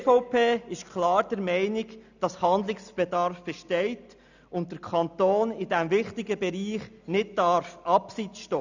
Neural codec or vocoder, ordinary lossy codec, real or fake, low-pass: none; none; real; 7.2 kHz